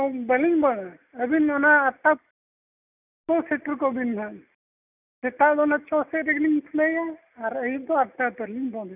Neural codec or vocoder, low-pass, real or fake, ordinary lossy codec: none; 3.6 kHz; real; none